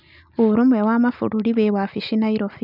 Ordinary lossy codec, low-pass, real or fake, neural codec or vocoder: none; 5.4 kHz; real; none